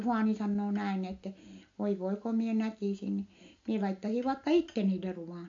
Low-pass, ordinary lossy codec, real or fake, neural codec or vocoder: 7.2 kHz; MP3, 48 kbps; real; none